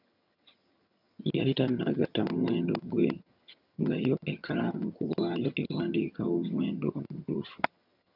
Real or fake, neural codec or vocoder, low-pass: fake; vocoder, 22.05 kHz, 80 mel bands, HiFi-GAN; 5.4 kHz